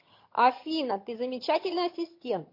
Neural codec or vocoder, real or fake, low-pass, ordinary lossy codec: vocoder, 22.05 kHz, 80 mel bands, HiFi-GAN; fake; 5.4 kHz; MP3, 32 kbps